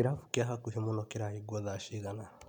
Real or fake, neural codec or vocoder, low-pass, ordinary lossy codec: real; none; 19.8 kHz; none